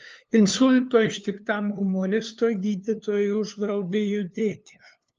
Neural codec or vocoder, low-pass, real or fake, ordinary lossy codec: codec, 16 kHz, 2 kbps, FunCodec, trained on LibriTTS, 25 frames a second; 7.2 kHz; fake; Opus, 24 kbps